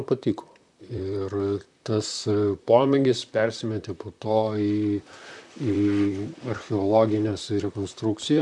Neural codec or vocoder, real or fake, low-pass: vocoder, 44.1 kHz, 128 mel bands, Pupu-Vocoder; fake; 10.8 kHz